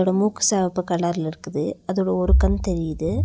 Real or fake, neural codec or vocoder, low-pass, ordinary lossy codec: real; none; none; none